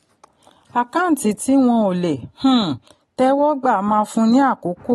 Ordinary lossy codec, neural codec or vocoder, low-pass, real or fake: AAC, 32 kbps; none; 19.8 kHz; real